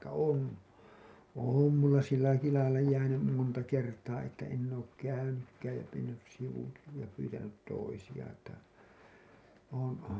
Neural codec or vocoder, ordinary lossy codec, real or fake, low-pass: none; none; real; none